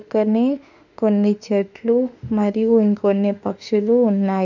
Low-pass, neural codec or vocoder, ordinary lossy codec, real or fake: 7.2 kHz; autoencoder, 48 kHz, 32 numbers a frame, DAC-VAE, trained on Japanese speech; none; fake